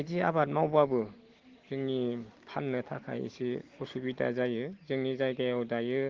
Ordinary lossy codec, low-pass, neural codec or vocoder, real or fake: Opus, 16 kbps; 7.2 kHz; none; real